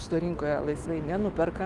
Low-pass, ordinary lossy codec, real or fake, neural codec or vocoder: 10.8 kHz; Opus, 24 kbps; fake; autoencoder, 48 kHz, 128 numbers a frame, DAC-VAE, trained on Japanese speech